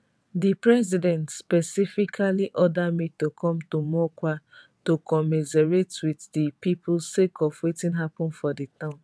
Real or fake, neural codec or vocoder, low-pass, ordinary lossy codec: fake; vocoder, 22.05 kHz, 80 mel bands, WaveNeXt; none; none